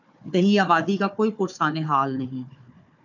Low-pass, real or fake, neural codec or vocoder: 7.2 kHz; fake; codec, 16 kHz, 4 kbps, FunCodec, trained on Chinese and English, 50 frames a second